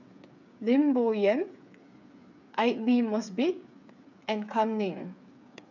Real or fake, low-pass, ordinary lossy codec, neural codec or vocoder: fake; 7.2 kHz; none; codec, 16 kHz, 8 kbps, FreqCodec, smaller model